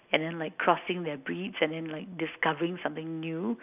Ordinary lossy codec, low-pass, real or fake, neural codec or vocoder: none; 3.6 kHz; real; none